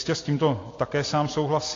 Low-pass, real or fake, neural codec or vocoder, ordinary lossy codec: 7.2 kHz; real; none; AAC, 32 kbps